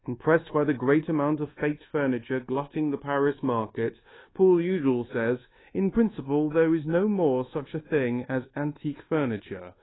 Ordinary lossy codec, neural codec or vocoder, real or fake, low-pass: AAC, 16 kbps; codec, 24 kHz, 1.2 kbps, DualCodec; fake; 7.2 kHz